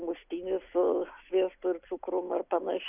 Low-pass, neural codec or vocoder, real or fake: 3.6 kHz; none; real